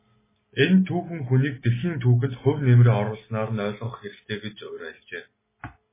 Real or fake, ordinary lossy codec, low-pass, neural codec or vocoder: real; MP3, 16 kbps; 3.6 kHz; none